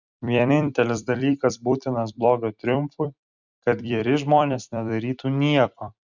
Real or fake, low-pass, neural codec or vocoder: fake; 7.2 kHz; vocoder, 44.1 kHz, 128 mel bands every 256 samples, BigVGAN v2